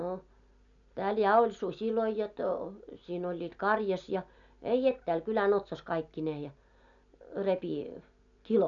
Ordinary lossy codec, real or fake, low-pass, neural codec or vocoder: none; real; 7.2 kHz; none